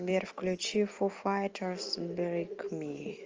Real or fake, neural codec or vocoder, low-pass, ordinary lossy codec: real; none; 7.2 kHz; Opus, 24 kbps